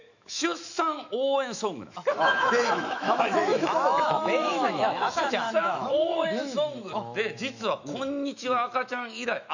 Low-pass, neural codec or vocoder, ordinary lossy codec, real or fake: 7.2 kHz; autoencoder, 48 kHz, 128 numbers a frame, DAC-VAE, trained on Japanese speech; none; fake